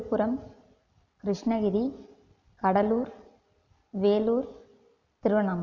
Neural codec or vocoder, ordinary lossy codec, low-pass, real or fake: none; none; 7.2 kHz; real